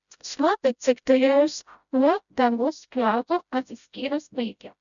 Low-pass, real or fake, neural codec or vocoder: 7.2 kHz; fake; codec, 16 kHz, 0.5 kbps, FreqCodec, smaller model